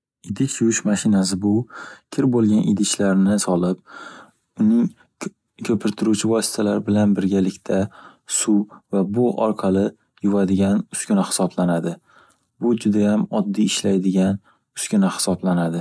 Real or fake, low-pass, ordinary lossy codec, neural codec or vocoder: real; none; none; none